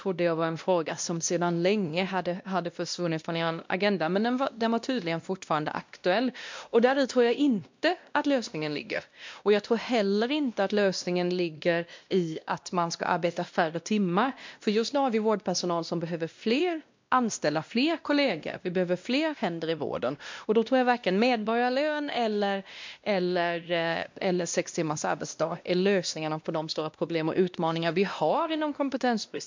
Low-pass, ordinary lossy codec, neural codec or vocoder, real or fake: 7.2 kHz; MP3, 64 kbps; codec, 16 kHz, 1 kbps, X-Codec, WavLM features, trained on Multilingual LibriSpeech; fake